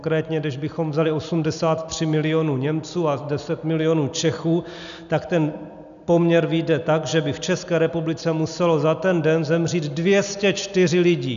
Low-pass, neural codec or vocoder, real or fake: 7.2 kHz; none; real